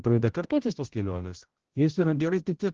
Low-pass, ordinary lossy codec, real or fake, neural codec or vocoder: 7.2 kHz; Opus, 16 kbps; fake; codec, 16 kHz, 0.5 kbps, X-Codec, HuBERT features, trained on general audio